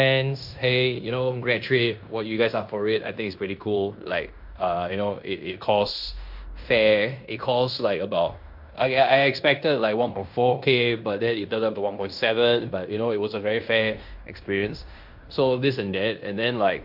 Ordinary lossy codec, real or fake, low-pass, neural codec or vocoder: MP3, 48 kbps; fake; 5.4 kHz; codec, 16 kHz in and 24 kHz out, 0.9 kbps, LongCat-Audio-Codec, fine tuned four codebook decoder